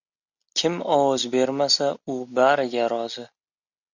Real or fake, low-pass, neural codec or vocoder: real; 7.2 kHz; none